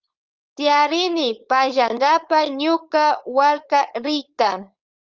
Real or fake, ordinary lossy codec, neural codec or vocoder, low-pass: fake; Opus, 32 kbps; codec, 16 kHz, 4.8 kbps, FACodec; 7.2 kHz